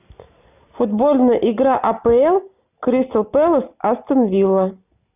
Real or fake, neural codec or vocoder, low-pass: real; none; 3.6 kHz